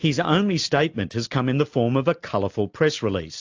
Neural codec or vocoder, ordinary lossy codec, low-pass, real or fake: none; AAC, 48 kbps; 7.2 kHz; real